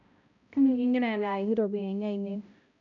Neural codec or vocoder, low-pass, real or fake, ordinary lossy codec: codec, 16 kHz, 0.5 kbps, X-Codec, HuBERT features, trained on balanced general audio; 7.2 kHz; fake; none